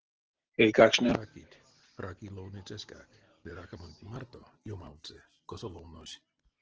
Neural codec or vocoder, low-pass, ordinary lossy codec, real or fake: none; 7.2 kHz; Opus, 16 kbps; real